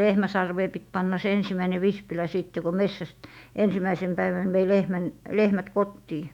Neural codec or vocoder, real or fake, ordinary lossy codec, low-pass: autoencoder, 48 kHz, 128 numbers a frame, DAC-VAE, trained on Japanese speech; fake; none; 19.8 kHz